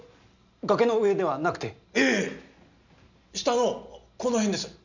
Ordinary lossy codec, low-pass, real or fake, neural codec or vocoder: none; 7.2 kHz; real; none